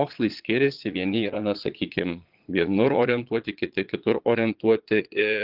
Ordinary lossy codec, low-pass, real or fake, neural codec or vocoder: Opus, 24 kbps; 5.4 kHz; fake; vocoder, 22.05 kHz, 80 mel bands, Vocos